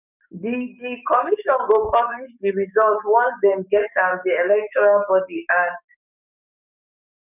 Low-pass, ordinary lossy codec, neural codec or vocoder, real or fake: 3.6 kHz; none; codec, 44.1 kHz, 7.8 kbps, DAC; fake